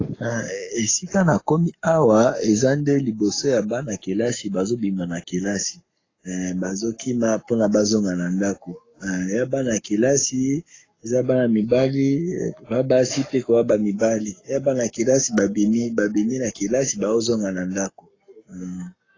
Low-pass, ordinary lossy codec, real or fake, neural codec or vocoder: 7.2 kHz; AAC, 32 kbps; fake; codec, 44.1 kHz, 7.8 kbps, Pupu-Codec